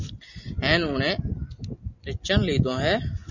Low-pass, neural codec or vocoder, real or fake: 7.2 kHz; none; real